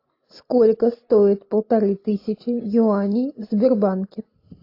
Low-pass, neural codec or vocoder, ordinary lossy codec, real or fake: 5.4 kHz; none; AAC, 32 kbps; real